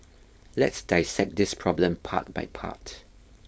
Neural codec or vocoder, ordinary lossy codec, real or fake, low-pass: codec, 16 kHz, 4.8 kbps, FACodec; none; fake; none